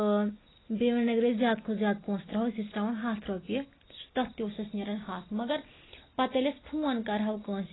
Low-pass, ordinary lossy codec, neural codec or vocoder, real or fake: 7.2 kHz; AAC, 16 kbps; none; real